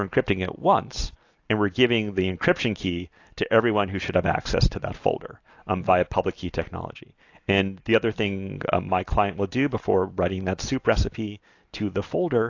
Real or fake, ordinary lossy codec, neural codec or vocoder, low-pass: real; AAC, 48 kbps; none; 7.2 kHz